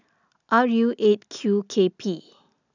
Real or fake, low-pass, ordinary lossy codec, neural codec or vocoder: real; 7.2 kHz; none; none